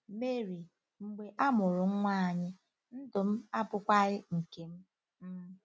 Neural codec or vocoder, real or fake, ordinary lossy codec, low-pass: none; real; none; none